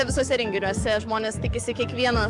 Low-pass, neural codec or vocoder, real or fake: 10.8 kHz; codec, 44.1 kHz, 7.8 kbps, DAC; fake